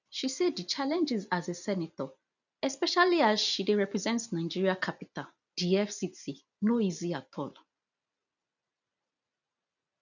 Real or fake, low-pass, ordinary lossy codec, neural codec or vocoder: real; 7.2 kHz; none; none